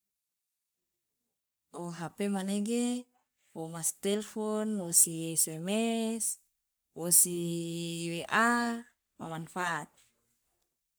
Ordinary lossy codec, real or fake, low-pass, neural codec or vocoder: none; fake; none; codec, 44.1 kHz, 3.4 kbps, Pupu-Codec